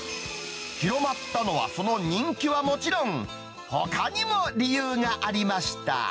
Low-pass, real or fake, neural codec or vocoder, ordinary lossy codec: none; real; none; none